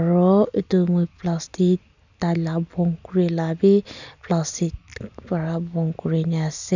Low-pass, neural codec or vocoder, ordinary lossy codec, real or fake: 7.2 kHz; none; none; real